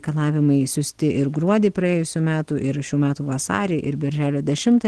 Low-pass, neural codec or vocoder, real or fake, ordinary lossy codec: 10.8 kHz; none; real; Opus, 16 kbps